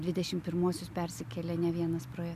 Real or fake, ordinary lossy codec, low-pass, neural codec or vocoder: real; MP3, 96 kbps; 14.4 kHz; none